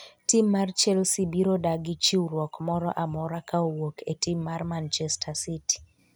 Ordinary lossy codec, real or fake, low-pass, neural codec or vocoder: none; real; none; none